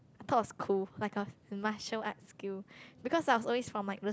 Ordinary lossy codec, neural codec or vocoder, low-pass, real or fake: none; none; none; real